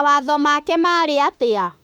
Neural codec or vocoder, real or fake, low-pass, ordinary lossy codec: autoencoder, 48 kHz, 32 numbers a frame, DAC-VAE, trained on Japanese speech; fake; 19.8 kHz; none